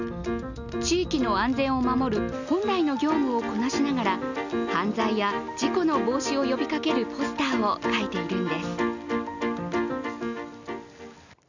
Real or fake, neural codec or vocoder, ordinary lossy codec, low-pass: real; none; none; 7.2 kHz